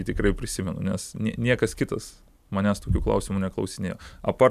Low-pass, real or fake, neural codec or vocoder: 14.4 kHz; real; none